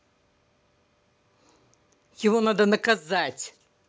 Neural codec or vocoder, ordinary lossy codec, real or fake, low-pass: none; none; real; none